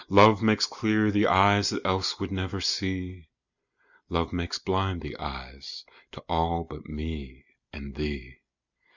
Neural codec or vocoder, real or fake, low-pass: none; real; 7.2 kHz